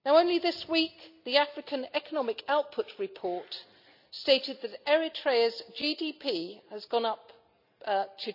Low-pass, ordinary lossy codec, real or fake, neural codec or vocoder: 5.4 kHz; none; real; none